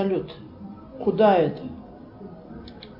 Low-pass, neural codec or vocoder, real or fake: 5.4 kHz; none; real